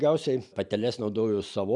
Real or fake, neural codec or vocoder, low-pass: real; none; 10.8 kHz